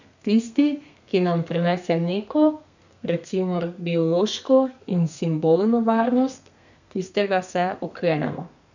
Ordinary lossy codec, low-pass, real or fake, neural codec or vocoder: none; 7.2 kHz; fake; codec, 32 kHz, 1.9 kbps, SNAC